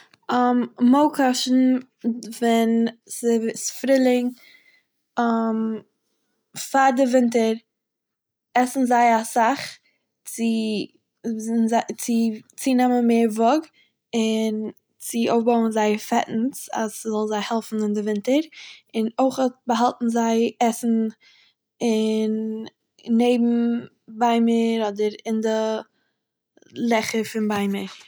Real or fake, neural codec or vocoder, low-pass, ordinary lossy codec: real; none; none; none